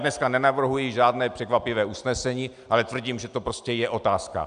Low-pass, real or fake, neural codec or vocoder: 9.9 kHz; real; none